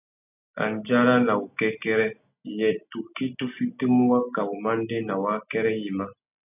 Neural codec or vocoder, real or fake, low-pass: none; real; 3.6 kHz